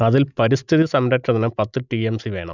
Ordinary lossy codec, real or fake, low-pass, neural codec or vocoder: none; real; 7.2 kHz; none